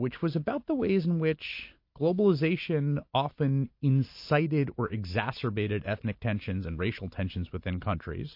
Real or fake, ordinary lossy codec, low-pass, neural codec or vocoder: real; MP3, 32 kbps; 5.4 kHz; none